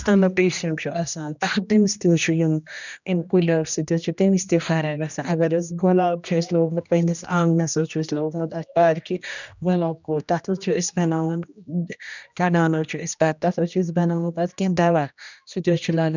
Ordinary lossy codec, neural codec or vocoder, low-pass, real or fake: none; codec, 16 kHz, 1 kbps, X-Codec, HuBERT features, trained on general audio; 7.2 kHz; fake